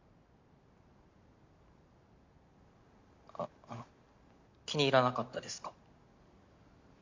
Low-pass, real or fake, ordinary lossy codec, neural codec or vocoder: 7.2 kHz; real; MP3, 64 kbps; none